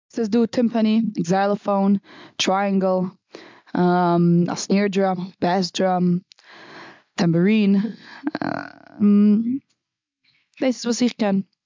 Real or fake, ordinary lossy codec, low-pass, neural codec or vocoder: real; MP3, 64 kbps; 7.2 kHz; none